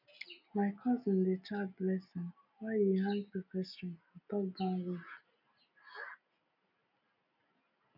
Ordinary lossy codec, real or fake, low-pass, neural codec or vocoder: none; real; 5.4 kHz; none